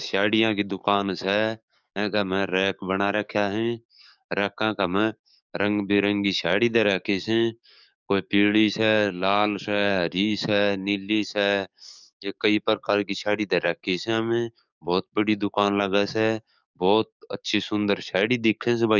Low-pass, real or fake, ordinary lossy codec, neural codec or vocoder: 7.2 kHz; fake; none; codec, 44.1 kHz, 7.8 kbps, DAC